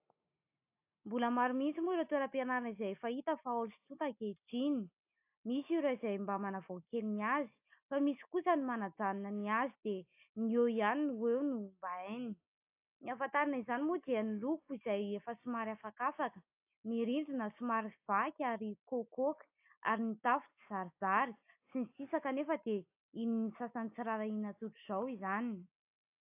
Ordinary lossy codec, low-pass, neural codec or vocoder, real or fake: AAC, 32 kbps; 3.6 kHz; none; real